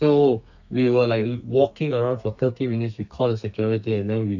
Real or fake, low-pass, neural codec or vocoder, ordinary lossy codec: fake; 7.2 kHz; codec, 32 kHz, 1.9 kbps, SNAC; none